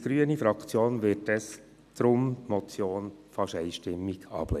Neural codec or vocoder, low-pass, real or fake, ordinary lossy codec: none; 14.4 kHz; real; none